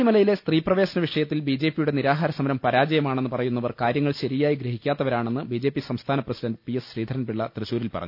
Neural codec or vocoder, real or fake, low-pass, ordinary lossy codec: none; real; 5.4 kHz; none